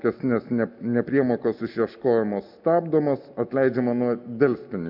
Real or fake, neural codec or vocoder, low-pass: real; none; 5.4 kHz